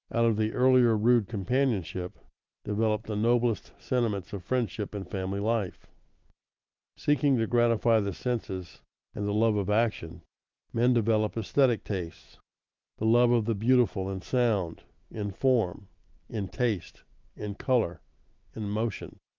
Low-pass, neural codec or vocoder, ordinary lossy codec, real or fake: 7.2 kHz; vocoder, 44.1 kHz, 128 mel bands every 512 samples, BigVGAN v2; Opus, 24 kbps; fake